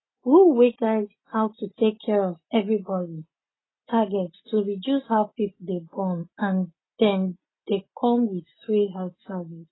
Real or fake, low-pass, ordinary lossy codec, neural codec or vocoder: real; 7.2 kHz; AAC, 16 kbps; none